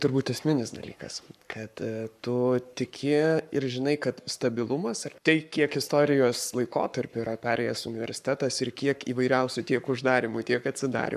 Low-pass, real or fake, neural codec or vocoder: 14.4 kHz; fake; codec, 44.1 kHz, 7.8 kbps, Pupu-Codec